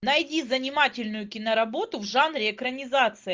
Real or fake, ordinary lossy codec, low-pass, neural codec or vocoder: real; Opus, 32 kbps; 7.2 kHz; none